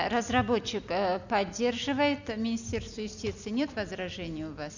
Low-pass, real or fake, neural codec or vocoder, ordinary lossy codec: 7.2 kHz; real; none; none